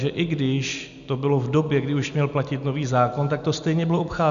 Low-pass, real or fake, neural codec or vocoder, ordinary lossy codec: 7.2 kHz; real; none; MP3, 96 kbps